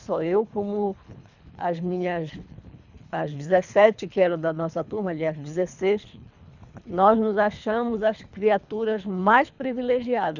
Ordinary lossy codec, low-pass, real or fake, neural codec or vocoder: none; 7.2 kHz; fake; codec, 24 kHz, 3 kbps, HILCodec